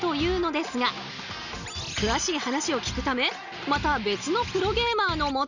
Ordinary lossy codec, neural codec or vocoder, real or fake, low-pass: none; none; real; 7.2 kHz